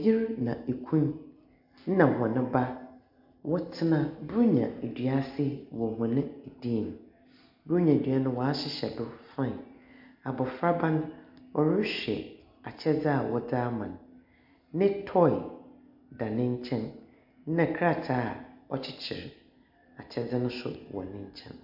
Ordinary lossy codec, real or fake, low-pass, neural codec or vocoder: MP3, 48 kbps; real; 5.4 kHz; none